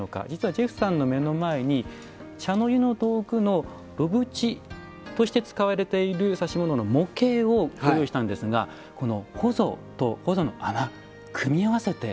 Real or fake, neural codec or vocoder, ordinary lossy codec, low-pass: real; none; none; none